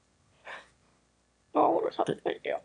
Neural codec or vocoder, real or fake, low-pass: autoencoder, 22.05 kHz, a latent of 192 numbers a frame, VITS, trained on one speaker; fake; 9.9 kHz